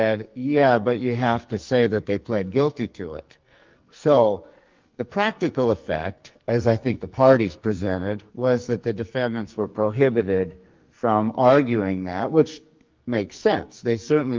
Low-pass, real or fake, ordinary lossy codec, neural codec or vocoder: 7.2 kHz; fake; Opus, 24 kbps; codec, 44.1 kHz, 2.6 kbps, SNAC